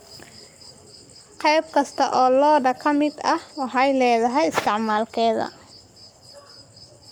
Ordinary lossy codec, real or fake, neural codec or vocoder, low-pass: none; fake; codec, 44.1 kHz, 7.8 kbps, Pupu-Codec; none